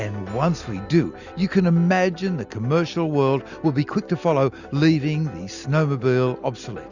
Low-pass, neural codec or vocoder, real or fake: 7.2 kHz; none; real